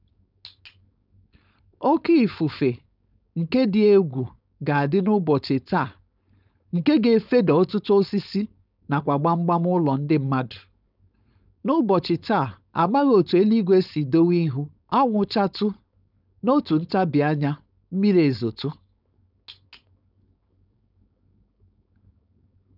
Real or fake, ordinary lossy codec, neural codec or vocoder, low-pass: fake; none; codec, 16 kHz, 4.8 kbps, FACodec; 5.4 kHz